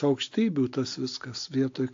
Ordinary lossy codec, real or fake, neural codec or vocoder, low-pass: AAC, 48 kbps; real; none; 7.2 kHz